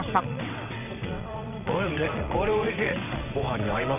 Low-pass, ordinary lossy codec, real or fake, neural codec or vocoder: 3.6 kHz; none; fake; vocoder, 22.05 kHz, 80 mel bands, WaveNeXt